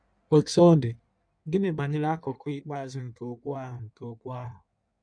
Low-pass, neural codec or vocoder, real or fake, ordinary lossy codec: 9.9 kHz; codec, 16 kHz in and 24 kHz out, 1.1 kbps, FireRedTTS-2 codec; fake; none